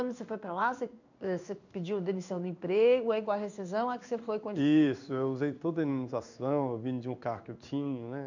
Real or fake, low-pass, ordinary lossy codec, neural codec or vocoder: fake; 7.2 kHz; none; codec, 16 kHz in and 24 kHz out, 1 kbps, XY-Tokenizer